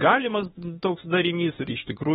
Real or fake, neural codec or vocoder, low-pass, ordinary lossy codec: fake; autoencoder, 48 kHz, 32 numbers a frame, DAC-VAE, trained on Japanese speech; 19.8 kHz; AAC, 16 kbps